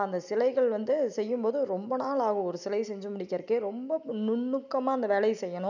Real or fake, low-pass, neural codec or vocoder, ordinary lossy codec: real; 7.2 kHz; none; none